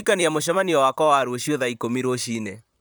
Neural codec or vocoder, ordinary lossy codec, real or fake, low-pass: vocoder, 44.1 kHz, 128 mel bands, Pupu-Vocoder; none; fake; none